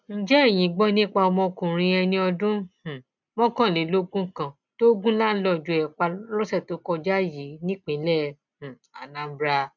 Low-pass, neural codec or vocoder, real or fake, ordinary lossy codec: 7.2 kHz; none; real; none